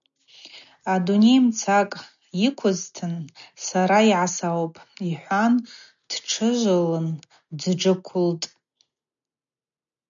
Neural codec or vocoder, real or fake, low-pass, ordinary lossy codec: none; real; 7.2 kHz; MP3, 96 kbps